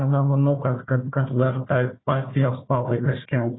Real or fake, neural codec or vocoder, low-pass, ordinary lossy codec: fake; codec, 16 kHz, 1 kbps, FunCodec, trained on Chinese and English, 50 frames a second; 7.2 kHz; AAC, 16 kbps